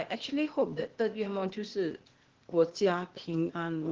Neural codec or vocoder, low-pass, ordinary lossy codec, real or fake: codec, 16 kHz, 0.8 kbps, ZipCodec; 7.2 kHz; Opus, 16 kbps; fake